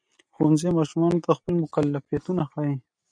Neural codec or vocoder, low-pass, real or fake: none; 9.9 kHz; real